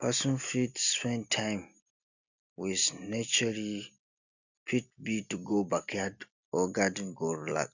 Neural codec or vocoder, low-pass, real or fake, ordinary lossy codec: none; 7.2 kHz; real; none